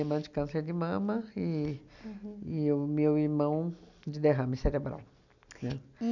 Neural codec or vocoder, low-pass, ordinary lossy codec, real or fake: none; 7.2 kHz; none; real